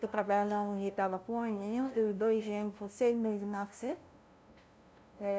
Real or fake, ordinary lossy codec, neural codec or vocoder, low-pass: fake; none; codec, 16 kHz, 0.5 kbps, FunCodec, trained on LibriTTS, 25 frames a second; none